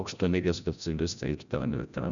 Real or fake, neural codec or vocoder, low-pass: fake; codec, 16 kHz, 0.5 kbps, FreqCodec, larger model; 7.2 kHz